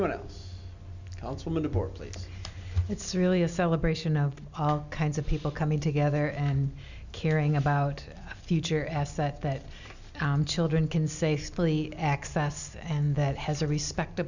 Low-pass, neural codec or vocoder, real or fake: 7.2 kHz; none; real